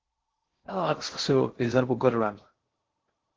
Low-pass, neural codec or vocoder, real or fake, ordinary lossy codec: 7.2 kHz; codec, 16 kHz in and 24 kHz out, 0.6 kbps, FocalCodec, streaming, 2048 codes; fake; Opus, 16 kbps